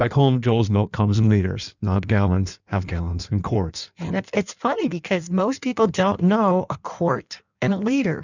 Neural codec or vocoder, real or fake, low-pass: codec, 16 kHz in and 24 kHz out, 1.1 kbps, FireRedTTS-2 codec; fake; 7.2 kHz